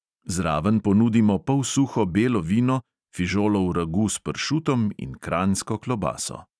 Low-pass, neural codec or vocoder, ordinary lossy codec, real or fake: none; none; none; real